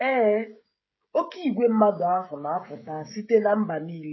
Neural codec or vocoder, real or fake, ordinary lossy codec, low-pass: codec, 16 kHz, 16 kbps, FreqCodec, smaller model; fake; MP3, 24 kbps; 7.2 kHz